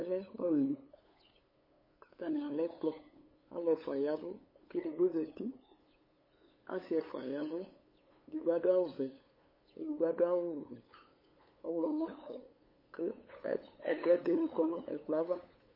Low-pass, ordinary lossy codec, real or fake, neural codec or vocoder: 5.4 kHz; MP3, 24 kbps; fake; codec, 16 kHz, 8 kbps, FunCodec, trained on LibriTTS, 25 frames a second